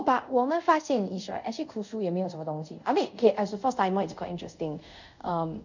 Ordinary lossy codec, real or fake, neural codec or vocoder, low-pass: none; fake; codec, 24 kHz, 0.5 kbps, DualCodec; 7.2 kHz